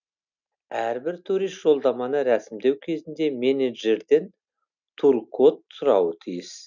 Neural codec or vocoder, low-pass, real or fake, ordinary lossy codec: none; 7.2 kHz; real; none